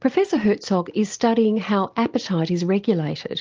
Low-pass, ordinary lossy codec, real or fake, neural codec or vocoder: 7.2 kHz; Opus, 24 kbps; real; none